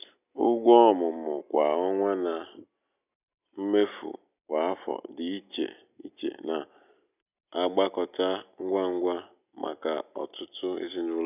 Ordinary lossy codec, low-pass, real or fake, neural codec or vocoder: none; 3.6 kHz; real; none